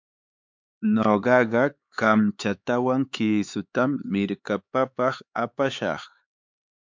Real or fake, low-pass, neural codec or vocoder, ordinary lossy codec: fake; 7.2 kHz; codec, 16 kHz, 4 kbps, X-Codec, HuBERT features, trained on LibriSpeech; MP3, 64 kbps